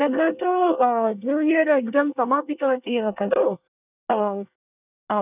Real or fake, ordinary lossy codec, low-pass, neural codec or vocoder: fake; none; 3.6 kHz; codec, 24 kHz, 1 kbps, SNAC